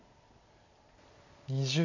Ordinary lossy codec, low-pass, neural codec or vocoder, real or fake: none; 7.2 kHz; none; real